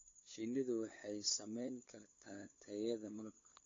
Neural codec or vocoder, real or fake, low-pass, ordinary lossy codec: codec, 16 kHz, 8 kbps, FunCodec, trained on LibriTTS, 25 frames a second; fake; 7.2 kHz; AAC, 32 kbps